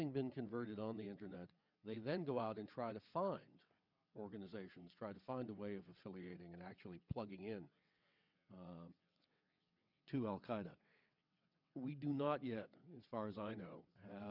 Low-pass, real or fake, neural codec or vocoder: 5.4 kHz; fake; vocoder, 22.05 kHz, 80 mel bands, WaveNeXt